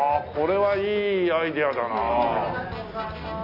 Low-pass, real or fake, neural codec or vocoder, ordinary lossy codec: 5.4 kHz; real; none; none